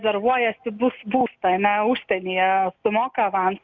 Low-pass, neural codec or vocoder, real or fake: 7.2 kHz; none; real